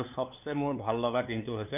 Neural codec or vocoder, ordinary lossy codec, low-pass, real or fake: codec, 16 kHz, 2 kbps, FunCodec, trained on LibriTTS, 25 frames a second; none; 3.6 kHz; fake